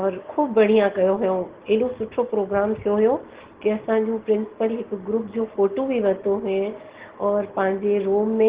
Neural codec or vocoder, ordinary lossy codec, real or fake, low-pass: none; Opus, 16 kbps; real; 3.6 kHz